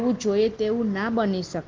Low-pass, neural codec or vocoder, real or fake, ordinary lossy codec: 7.2 kHz; none; real; Opus, 16 kbps